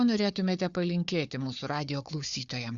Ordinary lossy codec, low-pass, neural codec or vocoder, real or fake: Opus, 64 kbps; 7.2 kHz; codec, 16 kHz, 4 kbps, FunCodec, trained on Chinese and English, 50 frames a second; fake